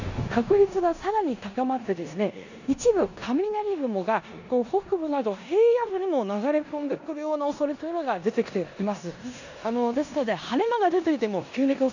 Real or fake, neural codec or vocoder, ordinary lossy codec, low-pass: fake; codec, 16 kHz in and 24 kHz out, 0.9 kbps, LongCat-Audio-Codec, four codebook decoder; none; 7.2 kHz